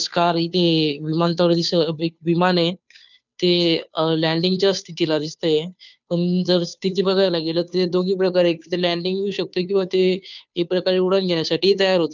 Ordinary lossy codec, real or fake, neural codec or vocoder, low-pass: none; fake; codec, 16 kHz, 2 kbps, FunCodec, trained on Chinese and English, 25 frames a second; 7.2 kHz